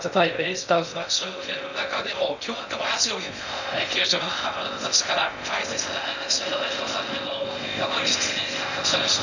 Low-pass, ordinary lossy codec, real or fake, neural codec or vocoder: 7.2 kHz; none; fake; codec, 16 kHz in and 24 kHz out, 0.6 kbps, FocalCodec, streaming, 2048 codes